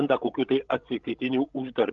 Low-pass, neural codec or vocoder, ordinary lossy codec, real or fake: 7.2 kHz; codec, 16 kHz, 16 kbps, FreqCodec, larger model; Opus, 32 kbps; fake